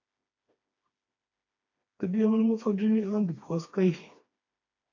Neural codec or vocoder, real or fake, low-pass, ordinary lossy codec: codec, 16 kHz, 2 kbps, FreqCodec, smaller model; fake; 7.2 kHz; AAC, 48 kbps